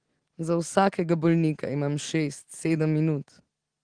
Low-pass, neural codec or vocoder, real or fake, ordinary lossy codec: 9.9 kHz; none; real; Opus, 16 kbps